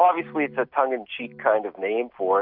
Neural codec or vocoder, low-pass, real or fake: none; 5.4 kHz; real